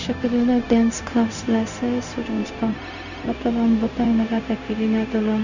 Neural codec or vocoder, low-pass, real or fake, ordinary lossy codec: codec, 16 kHz, 0.4 kbps, LongCat-Audio-Codec; 7.2 kHz; fake; none